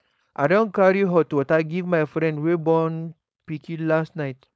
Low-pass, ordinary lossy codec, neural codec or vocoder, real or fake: none; none; codec, 16 kHz, 4.8 kbps, FACodec; fake